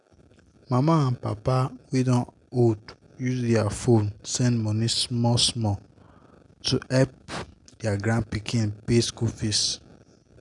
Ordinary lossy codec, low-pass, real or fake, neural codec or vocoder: none; 10.8 kHz; real; none